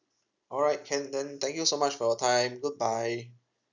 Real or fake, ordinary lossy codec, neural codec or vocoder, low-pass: real; none; none; 7.2 kHz